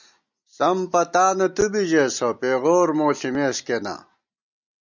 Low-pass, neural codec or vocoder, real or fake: 7.2 kHz; none; real